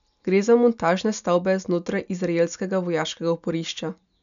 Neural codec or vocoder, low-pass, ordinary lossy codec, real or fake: none; 7.2 kHz; none; real